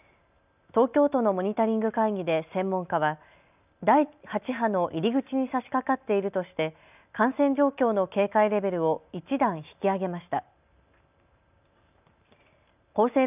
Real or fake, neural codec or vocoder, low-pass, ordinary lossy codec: real; none; 3.6 kHz; none